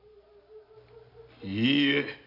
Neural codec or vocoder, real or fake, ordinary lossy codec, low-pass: none; real; none; 5.4 kHz